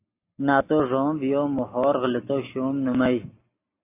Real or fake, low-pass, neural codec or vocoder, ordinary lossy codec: real; 3.6 kHz; none; AAC, 24 kbps